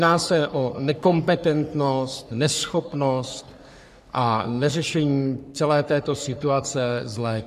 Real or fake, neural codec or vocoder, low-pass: fake; codec, 44.1 kHz, 3.4 kbps, Pupu-Codec; 14.4 kHz